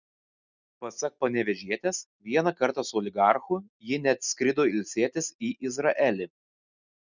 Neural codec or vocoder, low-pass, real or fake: none; 7.2 kHz; real